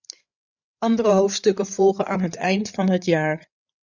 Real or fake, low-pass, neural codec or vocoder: fake; 7.2 kHz; codec, 16 kHz, 8 kbps, FreqCodec, larger model